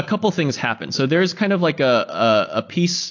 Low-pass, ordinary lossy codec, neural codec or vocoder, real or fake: 7.2 kHz; AAC, 48 kbps; none; real